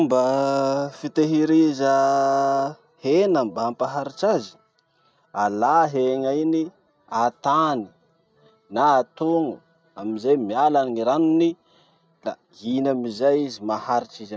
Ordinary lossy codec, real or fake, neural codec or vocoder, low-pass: none; real; none; none